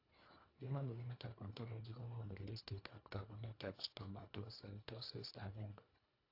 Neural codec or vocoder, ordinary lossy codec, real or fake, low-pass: codec, 24 kHz, 1.5 kbps, HILCodec; AAC, 48 kbps; fake; 5.4 kHz